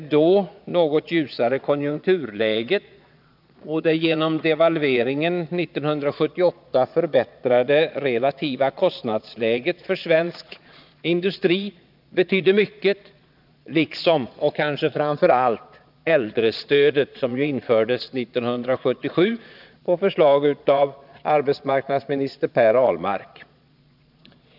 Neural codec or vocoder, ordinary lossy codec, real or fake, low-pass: vocoder, 22.05 kHz, 80 mel bands, Vocos; none; fake; 5.4 kHz